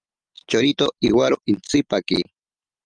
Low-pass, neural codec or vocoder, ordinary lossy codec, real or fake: 9.9 kHz; none; Opus, 32 kbps; real